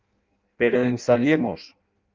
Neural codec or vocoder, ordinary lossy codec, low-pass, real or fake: codec, 16 kHz in and 24 kHz out, 0.6 kbps, FireRedTTS-2 codec; Opus, 32 kbps; 7.2 kHz; fake